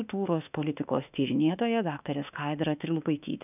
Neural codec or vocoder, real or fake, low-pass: autoencoder, 48 kHz, 32 numbers a frame, DAC-VAE, trained on Japanese speech; fake; 3.6 kHz